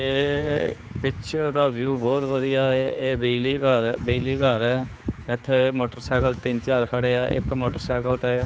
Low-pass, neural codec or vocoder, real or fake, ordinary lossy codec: none; codec, 16 kHz, 4 kbps, X-Codec, HuBERT features, trained on general audio; fake; none